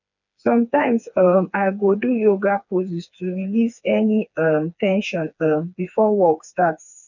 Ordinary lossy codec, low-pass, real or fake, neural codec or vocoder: none; 7.2 kHz; fake; codec, 16 kHz, 4 kbps, FreqCodec, smaller model